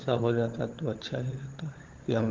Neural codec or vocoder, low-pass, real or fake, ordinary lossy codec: codec, 16 kHz, 16 kbps, FunCodec, trained on LibriTTS, 50 frames a second; 7.2 kHz; fake; Opus, 16 kbps